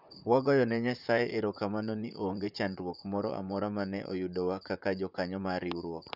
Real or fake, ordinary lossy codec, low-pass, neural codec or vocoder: fake; none; 5.4 kHz; vocoder, 44.1 kHz, 128 mel bands, Pupu-Vocoder